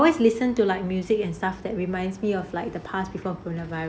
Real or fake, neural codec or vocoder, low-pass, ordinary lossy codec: real; none; none; none